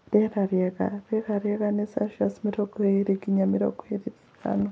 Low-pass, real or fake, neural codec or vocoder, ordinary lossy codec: none; real; none; none